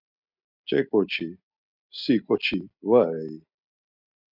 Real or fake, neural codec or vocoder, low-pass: real; none; 5.4 kHz